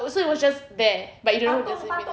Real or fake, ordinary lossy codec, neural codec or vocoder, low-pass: real; none; none; none